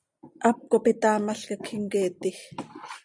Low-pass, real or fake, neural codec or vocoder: 9.9 kHz; real; none